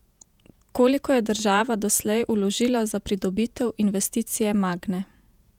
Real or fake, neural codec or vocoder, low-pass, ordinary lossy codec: fake; vocoder, 48 kHz, 128 mel bands, Vocos; 19.8 kHz; none